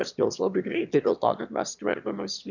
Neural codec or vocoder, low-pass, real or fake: autoencoder, 22.05 kHz, a latent of 192 numbers a frame, VITS, trained on one speaker; 7.2 kHz; fake